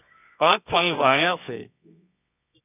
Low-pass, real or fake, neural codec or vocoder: 3.6 kHz; fake; codec, 24 kHz, 0.9 kbps, WavTokenizer, medium music audio release